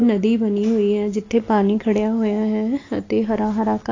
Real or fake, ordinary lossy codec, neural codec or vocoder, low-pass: real; AAC, 32 kbps; none; 7.2 kHz